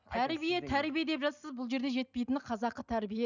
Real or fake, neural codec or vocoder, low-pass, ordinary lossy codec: real; none; 7.2 kHz; none